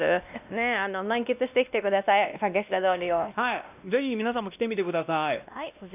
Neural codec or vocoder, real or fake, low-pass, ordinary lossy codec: codec, 16 kHz, 1 kbps, X-Codec, WavLM features, trained on Multilingual LibriSpeech; fake; 3.6 kHz; none